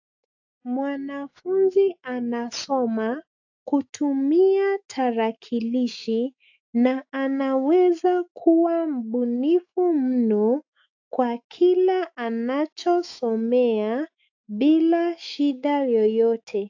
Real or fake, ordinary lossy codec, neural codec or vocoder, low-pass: fake; AAC, 48 kbps; autoencoder, 48 kHz, 128 numbers a frame, DAC-VAE, trained on Japanese speech; 7.2 kHz